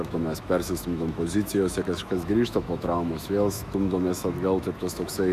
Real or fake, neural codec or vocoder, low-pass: fake; autoencoder, 48 kHz, 128 numbers a frame, DAC-VAE, trained on Japanese speech; 14.4 kHz